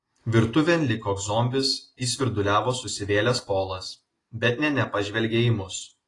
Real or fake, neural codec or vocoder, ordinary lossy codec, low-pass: real; none; AAC, 32 kbps; 10.8 kHz